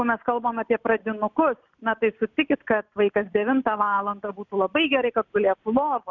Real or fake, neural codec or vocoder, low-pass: real; none; 7.2 kHz